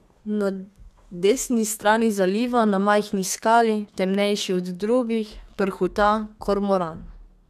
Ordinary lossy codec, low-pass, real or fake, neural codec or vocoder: none; 14.4 kHz; fake; codec, 32 kHz, 1.9 kbps, SNAC